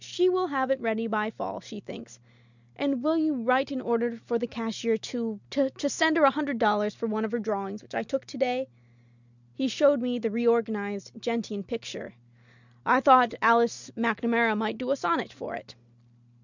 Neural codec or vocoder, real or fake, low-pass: none; real; 7.2 kHz